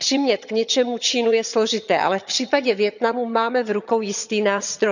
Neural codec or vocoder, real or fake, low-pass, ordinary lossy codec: vocoder, 22.05 kHz, 80 mel bands, HiFi-GAN; fake; 7.2 kHz; none